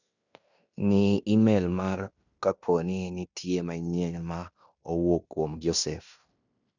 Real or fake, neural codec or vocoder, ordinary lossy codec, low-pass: fake; codec, 16 kHz in and 24 kHz out, 0.9 kbps, LongCat-Audio-Codec, fine tuned four codebook decoder; none; 7.2 kHz